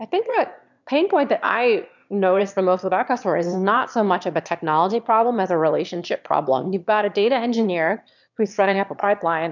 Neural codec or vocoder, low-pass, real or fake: autoencoder, 22.05 kHz, a latent of 192 numbers a frame, VITS, trained on one speaker; 7.2 kHz; fake